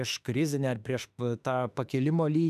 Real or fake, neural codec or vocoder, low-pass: fake; autoencoder, 48 kHz, 32 numbers a frame, DAC-VAE, trained on Japanese speech; 14.4 kHz